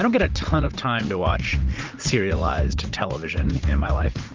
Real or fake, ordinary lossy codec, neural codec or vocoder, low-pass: fake; Opus, 32 kbps; vocoder, 44.1 kHz, 80 mel bands, Vocos; 7.2 kHz